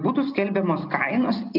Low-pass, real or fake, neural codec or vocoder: 5.4 kHz; real; none